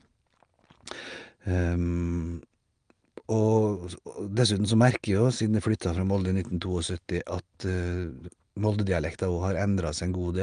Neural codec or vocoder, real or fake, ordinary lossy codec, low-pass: none; real; Opus, 32 kbps; 9.9 kHz